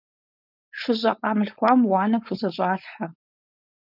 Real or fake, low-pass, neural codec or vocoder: fake; 5.4 kHz; codec, 16 kHz, 4.8 kbps, FACodec